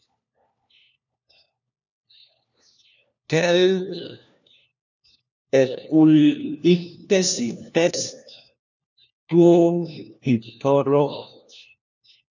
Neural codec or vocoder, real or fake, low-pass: codec, 16 kHz, 1 kbps, FunCodec, trained on LibriTTS, 50 frames a second; fake; 7.2 kHz